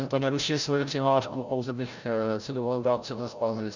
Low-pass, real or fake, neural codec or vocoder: 7.2 kHz; fake; codec, 16 kHz, 0.5 kbps, FreqCodec, larger model